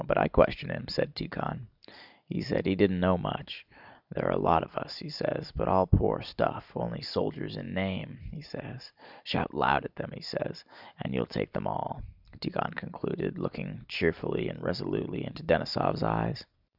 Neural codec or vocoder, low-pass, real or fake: none; 5.4 kHz; real